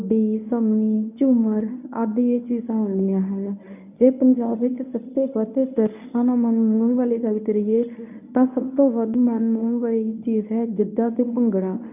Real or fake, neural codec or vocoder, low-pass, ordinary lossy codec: fake; codec, 24 kHz, 0.9 kbps, WavTokenizer, medium speech release version 1; 3.6 kHz; none